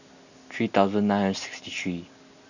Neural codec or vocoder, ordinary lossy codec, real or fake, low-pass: none; none; real; 7.2 kHz